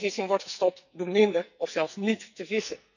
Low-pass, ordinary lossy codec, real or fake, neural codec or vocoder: 7.2 kHz; none; fake; codec, 44.1 kHz, 2.6 kbps, SNAC